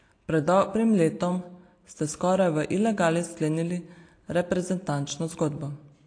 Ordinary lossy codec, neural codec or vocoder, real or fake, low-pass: AAC, 48 kbps; none; real; 9.9 kHz